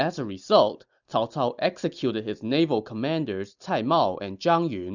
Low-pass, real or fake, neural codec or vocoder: 7.2 kHz; real; none